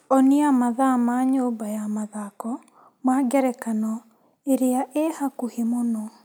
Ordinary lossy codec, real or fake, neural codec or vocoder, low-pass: none; real; none; none